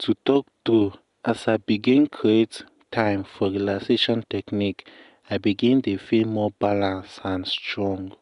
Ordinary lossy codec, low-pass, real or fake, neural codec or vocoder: none; 10.8 kHz; real; none